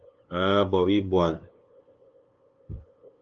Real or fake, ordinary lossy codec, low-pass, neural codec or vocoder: fake; Opus, 24 kbps; 7.2 kHz; codec, 16 kHz, 2 kbps, FunCodec, trained on LibriTTS, 25 frames a second